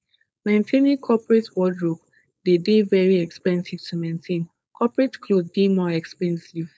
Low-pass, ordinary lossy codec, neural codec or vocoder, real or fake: none; none; codec, 16 kHz, 4.8 kbps, FACodec; fake